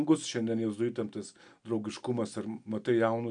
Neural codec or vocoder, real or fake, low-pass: none; real; 9.9 kHz